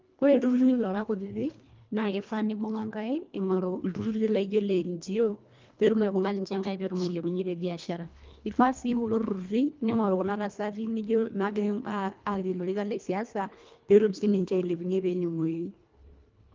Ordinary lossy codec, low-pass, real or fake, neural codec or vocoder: Opus, 24 kbps; 7.2 kHz; fake; codec, 24 kHz, 1.5 kbps, HILCodec